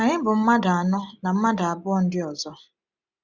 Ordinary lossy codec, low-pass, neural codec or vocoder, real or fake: none; 7.2 kHz; none; real